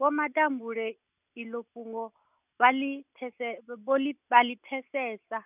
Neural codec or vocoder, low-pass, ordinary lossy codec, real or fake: none; 3.6 kHz; none; real